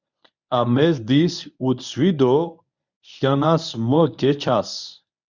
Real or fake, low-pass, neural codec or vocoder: fake; 7.2 kHz; codec, 24 kHz, 0.9 kbps, WavTokenizer, medium speech release version 1